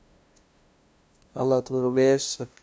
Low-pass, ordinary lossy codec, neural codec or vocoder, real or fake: none; none; codec, 16 kHz, 0.5 kbps, FunCodec, trained on LibriTTS, 25 frames a second; fake